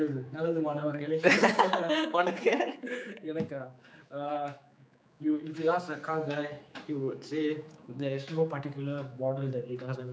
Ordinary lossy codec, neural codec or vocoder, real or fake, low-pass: none; codec, 16 kHz, 4 kbps, X-Codec, HuBERT features, trained on general audio; fake; none